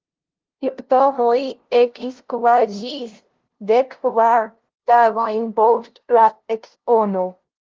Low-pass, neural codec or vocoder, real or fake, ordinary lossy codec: 7.2 kHz; codec, 16 kHz, 0.5 kbps, FunCodec, trained on LibriTTS, 25 frames a second; fake; Opus, 16 kbps